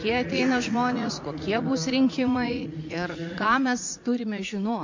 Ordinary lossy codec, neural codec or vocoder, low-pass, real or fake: MP3, 48 kbps; vocoder, 44.1 kHz, 80 mel bands, Vocos; 7.2 kHz; fake